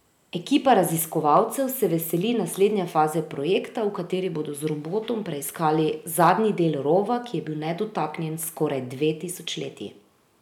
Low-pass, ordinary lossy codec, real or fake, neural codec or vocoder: 19.8 kHz; none; real; none